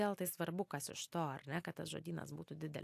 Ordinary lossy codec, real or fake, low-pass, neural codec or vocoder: AAC, 64 kbps; real; 14.4 kHz; none